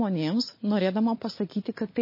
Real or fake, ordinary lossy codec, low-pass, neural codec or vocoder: real; MP3, 24 kbps; 5.4 kHz; none